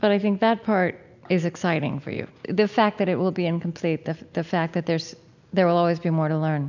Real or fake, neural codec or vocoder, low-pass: real; none; 7.2 kHz